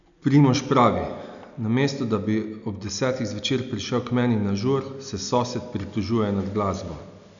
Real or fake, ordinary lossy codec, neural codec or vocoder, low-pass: real; none; none; 7.2 kHz